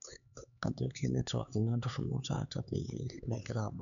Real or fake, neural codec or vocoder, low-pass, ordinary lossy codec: fake; codec, 16 kHz, 4 kbps, X-Codec, HuBERT features, trained on LibriSpeech; 7.2 kHz; none